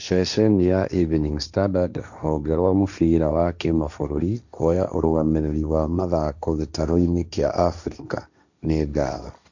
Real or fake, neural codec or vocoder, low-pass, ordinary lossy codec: fake; codec, 16 kHz, 1.1 kbps, Voila-Tokenizer; 7.2 kHz; none